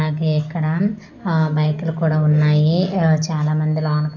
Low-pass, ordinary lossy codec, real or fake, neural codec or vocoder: 7.2 kHz; none; real; none